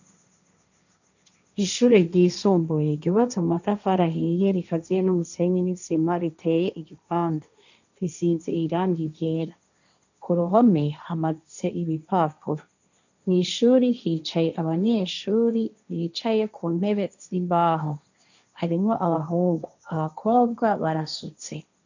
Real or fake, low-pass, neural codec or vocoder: fake; 7.2 kHz; codec, 16 kHz, 1.1 kbps, Voila-Tokenizer